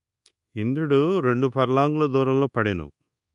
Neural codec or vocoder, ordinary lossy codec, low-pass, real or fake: codec, 24 kHz, 1.2 kbps, DualCodec; MP3, 64 kbps; 10.8 kHz; fake